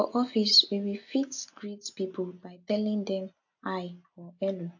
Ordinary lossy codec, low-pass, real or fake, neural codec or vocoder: AAC, 48 kbps; 7.2 kHz; real; none